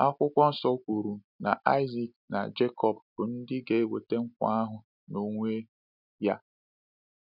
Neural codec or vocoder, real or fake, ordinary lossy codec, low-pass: none; real; none; 5.4 kHz